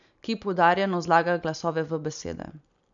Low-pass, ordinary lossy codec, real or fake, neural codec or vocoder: 7.2 kHz; none; real; none